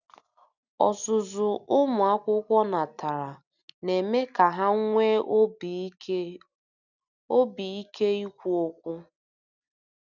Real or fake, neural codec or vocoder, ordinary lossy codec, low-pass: real; none; none; 7.2 kHz